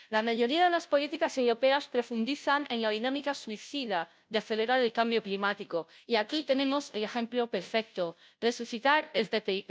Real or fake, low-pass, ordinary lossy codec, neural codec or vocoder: fake; none; none; codec, 16 kHz, 0.5 kbps, FunCodec, trained on Chinese and English, 25 frames a second